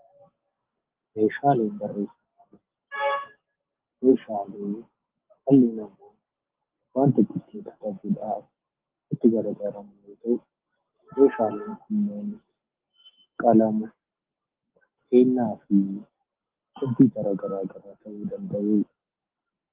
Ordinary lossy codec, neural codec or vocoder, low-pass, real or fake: Opus, 16 kbps; none; 3.6 kHz; real